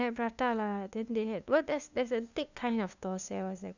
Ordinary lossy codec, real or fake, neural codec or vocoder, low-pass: none; fake; codec, 16 kHz, 2 kbps, FunCodec, trained on LibriTTS, 25 frames a second; 7.2 kHz